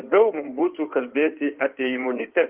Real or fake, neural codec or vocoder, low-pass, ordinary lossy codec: fake; codec, 16 kHz in and 24 kHz out, 2.2 kbps, FireRedTTS-2 codec; 3.6 kHz; Opus, 32 kbps